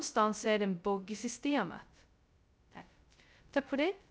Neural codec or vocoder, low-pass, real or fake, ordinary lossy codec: codec, 16 kHz, 0.2 kbps, FocalCodec; none; fake; none